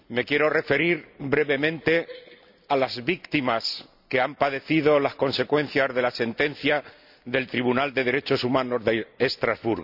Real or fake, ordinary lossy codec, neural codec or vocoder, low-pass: real; none; none; 5.4 kHz